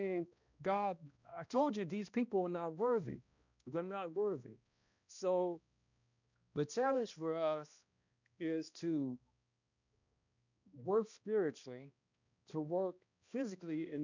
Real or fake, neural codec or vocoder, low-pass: fake; codec, 16 kHz, 1 kbps, X-Codec, HuBERT features, trained on balanced general audio; 7.2 kHz